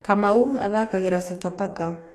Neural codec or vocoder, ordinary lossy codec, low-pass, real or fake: codec, 44.1 kHz, 2.6 kbps, DAC; AAC, 64 kbps; 14.4 kHz; fake